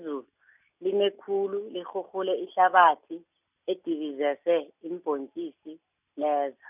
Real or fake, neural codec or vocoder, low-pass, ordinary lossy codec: real; none; 3.6 kHz; none